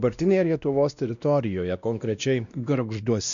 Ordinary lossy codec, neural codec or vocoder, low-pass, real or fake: MP3, 96 kbps; codec, 16 kHz, 1 kbps, X-Codec, WavLM features, trained on Multilingual LibriSpeech; 7.2 kHz; fake